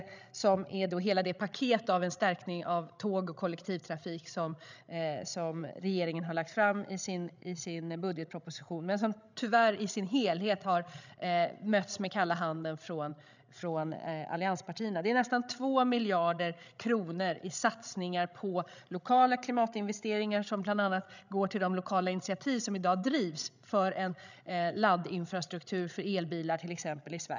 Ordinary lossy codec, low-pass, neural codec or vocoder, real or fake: none; 7.2 kHz; codec, 16 kHz, 16 kbps, FreqCodec, larger model; fake